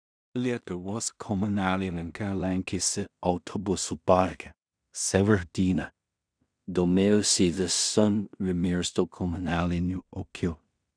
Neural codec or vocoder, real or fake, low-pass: codec, 16 kHz in and 24 kHz out, 0.4 kbps, LongCat-Audio-Codec, two codebook decoder; fake; 9.9 kHz